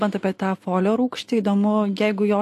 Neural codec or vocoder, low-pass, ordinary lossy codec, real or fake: none; 14.4 kHz; AAC, 64 kbps; real